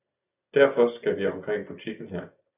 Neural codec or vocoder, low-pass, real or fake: none; 3.6 kHz; real